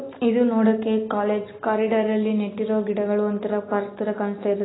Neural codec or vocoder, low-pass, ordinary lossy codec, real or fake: none; 7.2 kHz; AAC, 16 kbps; real